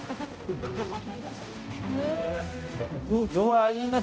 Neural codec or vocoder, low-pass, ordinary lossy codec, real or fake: codec, 16 kHz, 0.5 kbps, X-Codec, HuBERT features, trained on balanced general audio; none; none; fake